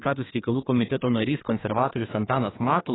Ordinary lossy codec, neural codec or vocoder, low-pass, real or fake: AAC, 16 kbps; codec, 32 kHz, 1.9 kbps, SNAC; 7.2 kHz; fake